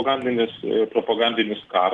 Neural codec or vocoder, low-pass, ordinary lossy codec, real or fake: none; 10.8 kHz; Opus, 16 kbps; real